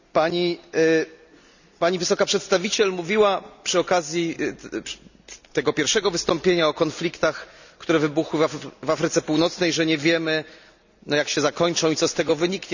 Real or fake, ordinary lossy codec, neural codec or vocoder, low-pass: real; none; none; 7.2 kHz